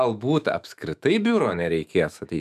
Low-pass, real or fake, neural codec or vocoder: 14.4 kHz; fake; autoencoder, 48 kHz, 128 numbers a frame, DAC-VAE, trained on Japanese speech